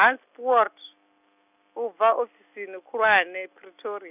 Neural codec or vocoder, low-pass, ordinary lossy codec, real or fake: none; 3.6 kHz; none; real